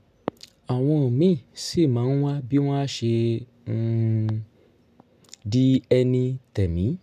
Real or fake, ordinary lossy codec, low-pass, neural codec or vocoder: real; none; 14.4 kHz; none